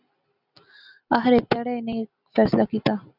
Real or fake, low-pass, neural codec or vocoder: real; 5.4 kHz; none